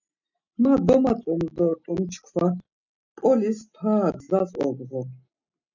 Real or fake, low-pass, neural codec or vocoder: real; 7.2 kHz; none